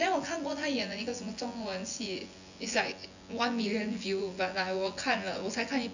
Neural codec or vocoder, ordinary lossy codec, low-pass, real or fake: vocoder, 24 kHz, 100 mel bands, Vocos; none; 7.2 kHz; fake